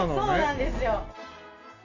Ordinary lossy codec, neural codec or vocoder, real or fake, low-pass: none; none; real; 7.2 kHz